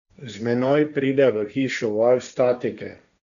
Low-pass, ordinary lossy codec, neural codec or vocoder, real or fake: 7.2 kHz; none; codec, 16 kHz, 1.1 kbps, Voila-Tokenizer; fake